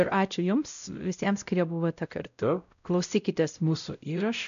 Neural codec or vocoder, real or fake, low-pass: codec, 16 kHz, 0.5 kbps, X-Codec, WavLM features, trained on Multilingual LibriSpeech; fake; 7.2 kHz